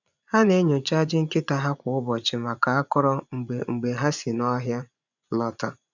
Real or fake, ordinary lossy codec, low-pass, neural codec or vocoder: fake; none; 7.2 kHz; vocoder, 24 kHz, 100 mel bands, Vocos